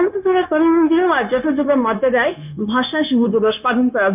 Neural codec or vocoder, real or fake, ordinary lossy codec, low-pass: codec, 16 kHz, 0.9 kbps, LongCat-Audio-Codec; fake; none; 3.6 kHz